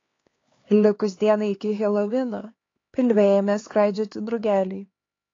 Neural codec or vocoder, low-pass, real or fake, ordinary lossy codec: codec, 16 kHz, 4 kbps, X-Codec, HuBERT features, trained on LibriSpeech; 7.2 kHz; fake; AAC, 32 kbps